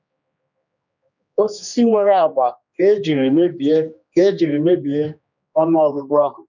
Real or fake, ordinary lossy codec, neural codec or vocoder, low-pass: fake; none; codec, 16 kHz, 2 kbps, X-Codec, HuBERT features, trained on general audio; 7.2 kHz